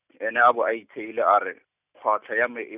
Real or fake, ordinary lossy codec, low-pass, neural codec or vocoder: real; none; 3.6 kHz; none